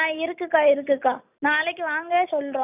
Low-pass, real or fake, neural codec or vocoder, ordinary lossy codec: 3.6 kHz; real; none; none